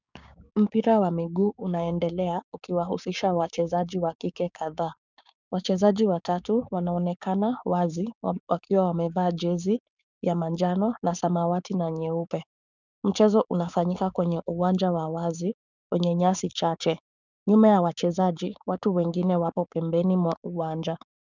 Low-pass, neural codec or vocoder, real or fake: 7.2 kHz; codec, 16 kHz, 6 kbps, DAC; fake